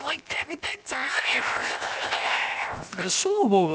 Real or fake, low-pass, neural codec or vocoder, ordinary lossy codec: fake; none; codec, 16 kHz, 0.7 kbps, FocalCodec; none